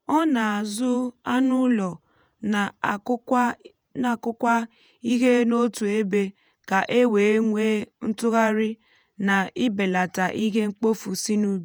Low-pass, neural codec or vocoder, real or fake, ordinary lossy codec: none; vocoder, 48 kHz, 128 mel bands, Vocos; fake; none